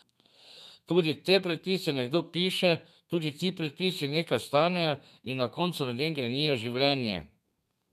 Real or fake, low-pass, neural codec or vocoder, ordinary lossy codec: fake; 14.4 kHz; codec, 32 kHz, 1.9 kbps, SNAC; none